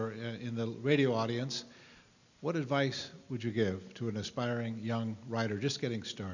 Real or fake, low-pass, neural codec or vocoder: real; 7.2 kHz; none